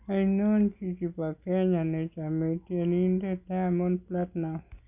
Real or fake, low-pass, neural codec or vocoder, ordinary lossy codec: real; 3.6 kHz; none; none